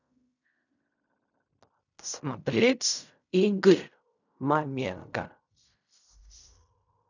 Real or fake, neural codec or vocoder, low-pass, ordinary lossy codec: fake; codec, 16 kHz in and 24 kHz out, 0.4 kbps, LongCat-Audio-Codec, fine tuned four codebook decoder; 7.2 kHz; none